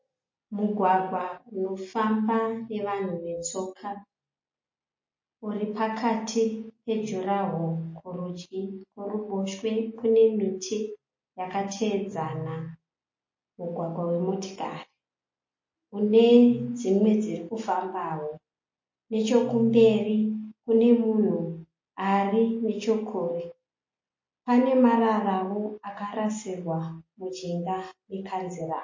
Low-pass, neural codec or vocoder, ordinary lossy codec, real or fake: 7.2 kHz; none; MP3, 32 kbps; real